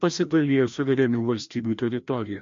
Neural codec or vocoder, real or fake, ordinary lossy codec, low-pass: codec, 16 kHz, 1 kbps, FreqCodec, larger model; fake; MP3, 48 kbps; 7.2 kHz